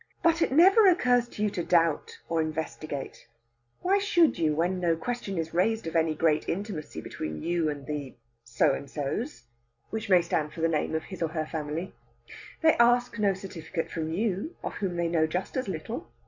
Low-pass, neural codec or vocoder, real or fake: 7.2 kHz; none; real